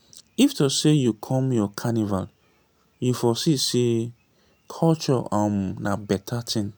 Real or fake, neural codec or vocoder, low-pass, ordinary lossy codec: real; none; none; none